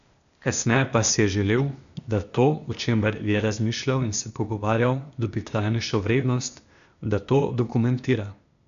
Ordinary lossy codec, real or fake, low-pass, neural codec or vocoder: none; fake; 7.2 kHz; codec, 16 kHz, 0.8 kbps, ZipCodec